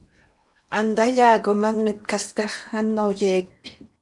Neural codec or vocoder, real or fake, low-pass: codec, 16 kHz in and 24 kHz out, 0.8 kbps, FocalCodec, streaming, 65536 codes; fake; 10.8 kHz